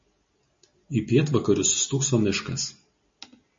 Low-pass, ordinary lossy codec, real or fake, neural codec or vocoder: 7.2 kHz; MP3, 32 kbps; real; none